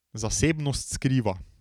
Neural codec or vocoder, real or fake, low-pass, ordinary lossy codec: none; real; 19.8 kHz; none